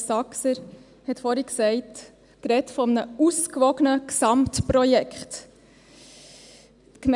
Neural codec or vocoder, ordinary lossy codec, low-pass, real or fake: none; none; 10.8 kHz; real